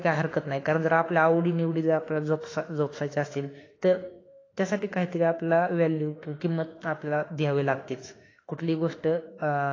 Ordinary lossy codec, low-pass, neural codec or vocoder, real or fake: AAC, 32 kbps; 7.2 kHz; autoencoder, 48 kHz, 32 numbers a frame, DAC-VAE, trained on Japanese speech; fake